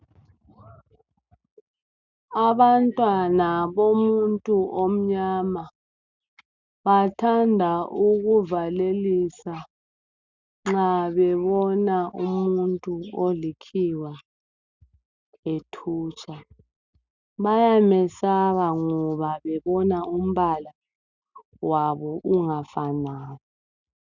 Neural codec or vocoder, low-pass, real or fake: none; 7.2 kHz; real